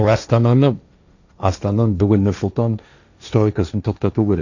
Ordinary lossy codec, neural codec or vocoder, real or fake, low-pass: none; codec, 16 kHz, 1.1 kbps, Voila-Tokenizer; fake; 7.2 kHz